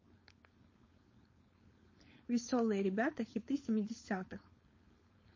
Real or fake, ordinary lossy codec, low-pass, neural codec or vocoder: fake; MP3, 32 kbps; 7.2 kHz; codec, 16 kHz, 4.8 kbps, FACodec